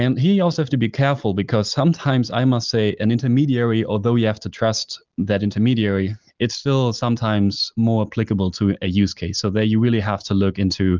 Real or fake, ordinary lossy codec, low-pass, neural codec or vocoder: real; Opus, 24 kbps; 7.2 kHz; none